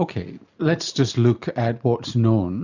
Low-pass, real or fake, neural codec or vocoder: 7.2 kHz; real; none